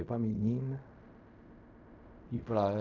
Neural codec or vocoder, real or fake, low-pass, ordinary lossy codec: codec, 16 kHz in and 24 kHz out, 0.4 kbps, LongCat-Audio-Codec, fine tuned four codebook decoder; fake; 7.2 kHz; none